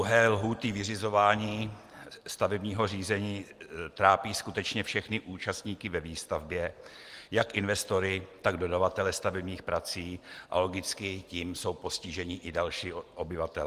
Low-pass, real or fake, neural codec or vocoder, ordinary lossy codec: 14.4 kHz; real; none; Opus, 24 kbps